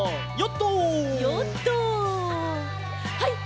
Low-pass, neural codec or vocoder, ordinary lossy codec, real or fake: none; none; none; real